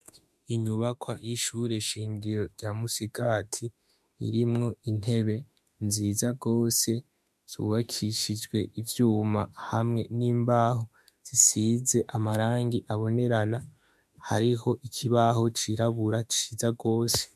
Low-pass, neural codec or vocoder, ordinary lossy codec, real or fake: 14.4 kHz; autoencoder, 48 kHz, 32 numbers a frame, DAC-VAE, trained on Japanese speech; MP3, 96 kbps; fake